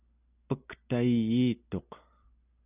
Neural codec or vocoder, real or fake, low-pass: none; real; 3.6 kHz